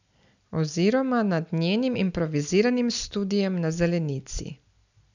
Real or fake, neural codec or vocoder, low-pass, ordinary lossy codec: real; none; 7.2 kHz; none